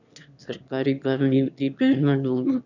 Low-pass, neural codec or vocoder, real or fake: 7.2 kHz; autoencoder, 22.05 kHz, a latent of 192 numbers a frame, VITS, trained on one speaker; fake